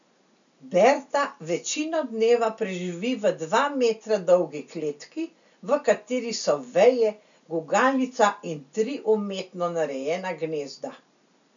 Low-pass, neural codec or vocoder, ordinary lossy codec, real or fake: 7.2 kHz; none; none; real